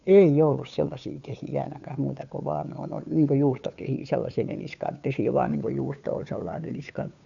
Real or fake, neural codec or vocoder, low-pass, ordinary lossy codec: fake; codec, 16 kHz, 2 kbps, FunCodec, trained on Chinese and English, 25 frames a second; 7.2 kHz; none